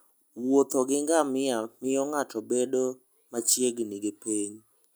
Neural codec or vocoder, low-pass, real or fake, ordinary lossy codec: none; none; real; none